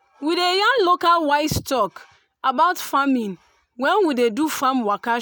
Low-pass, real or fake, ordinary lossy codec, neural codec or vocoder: none; real; none; none